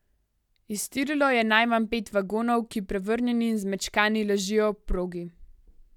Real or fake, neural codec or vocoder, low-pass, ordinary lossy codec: real; none; 19.8 kHz; none